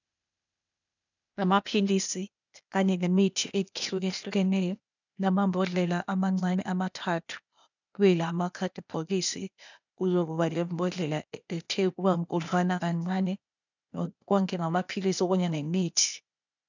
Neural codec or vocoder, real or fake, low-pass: codec, 16 kHz, 0.8 kbps, ZipCodec; fake; 7.2 kHz